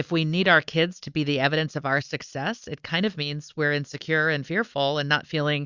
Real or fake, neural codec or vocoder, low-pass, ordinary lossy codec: real; none; 7.2 kHz; Opus, 64 kbps